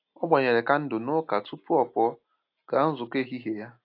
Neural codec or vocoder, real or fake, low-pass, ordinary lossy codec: none; real; 5.4 kHz; AAC, 32 kbps